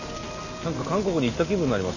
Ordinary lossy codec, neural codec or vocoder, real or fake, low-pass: none; none; real; 7.2 kHz